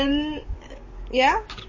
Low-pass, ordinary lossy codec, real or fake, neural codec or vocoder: 7.2 kHz; MP3, 32 kbps; fake; codec, 44.1 kHz, 7.8 kbps, DAC